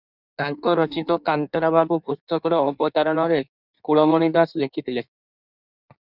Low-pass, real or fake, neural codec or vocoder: 5.4 kHz; fake; codec, 16 kHz in and 24 kHz out, 1.1 kbps, FireRedTTS-2 codec